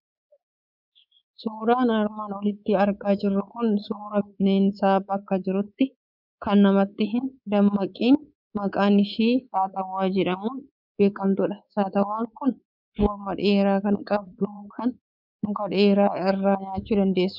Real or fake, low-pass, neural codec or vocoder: fake; 5.4 kHz; codec, 24 kHz, 3.1 kbps, DualCodec